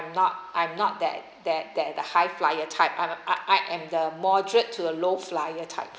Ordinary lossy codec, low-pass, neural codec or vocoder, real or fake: none; none; none; real